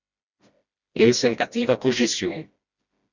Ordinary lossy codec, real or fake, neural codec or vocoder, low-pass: Opus, 64 kbps; fake; codec, 16 kHz, 1 kbps, FreqCodec, smaller model; 7.2 kHz